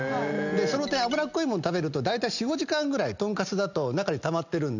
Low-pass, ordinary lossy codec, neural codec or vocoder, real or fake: 7.2 kHz; none; none; real